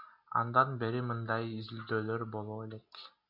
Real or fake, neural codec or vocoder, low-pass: real; none; 5.4 kHz